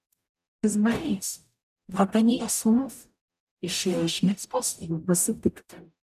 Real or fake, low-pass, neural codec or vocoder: fake; 14.4 kHz; codec, 44.1 kHz, 0.9 kbps, DAC